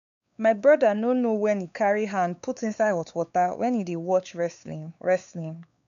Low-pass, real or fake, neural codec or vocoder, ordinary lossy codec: 7.2 kHz; fake; codec, 16 kHz, 4 kbps, X-Codec, WavLM features, trained on Multilingual LibriSpeech; none